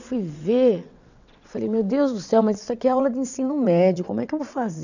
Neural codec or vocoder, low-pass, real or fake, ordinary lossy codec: vocoder, 22.05 kHz, 80 mel bands, WaveNeXt; 7.2 kHz; fake; none